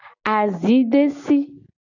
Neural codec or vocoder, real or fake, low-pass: none; real; 7.2 kHz